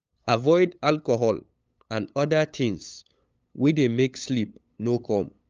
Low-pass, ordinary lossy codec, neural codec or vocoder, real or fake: 7.2 kHz; Opus, 24 kbps; codec, 16 kHz, 8 kbps, FunCodec, trained on LibriTTS, 25 frames a second; fake